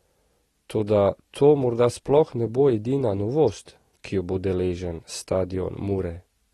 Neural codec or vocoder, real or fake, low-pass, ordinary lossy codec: none; real; 19.8 kHz; AAC, 32 kbps